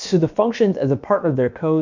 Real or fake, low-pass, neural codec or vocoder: fake; 7.2 kHz; codec, 16 kHz, about 1 kbps, DyCAST, with the encoder's durations